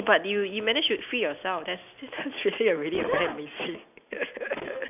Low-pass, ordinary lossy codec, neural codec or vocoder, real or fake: 3.6 kHz; none; none; real